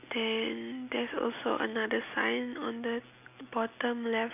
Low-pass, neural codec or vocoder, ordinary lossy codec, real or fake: 3.6 kHz; none; none; real